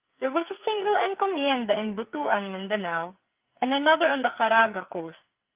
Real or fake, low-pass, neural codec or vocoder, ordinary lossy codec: fake; 3.6 kHz; codec, 44.1 kHz, 2.6 kbps, SNAC; Opus, 32 kbps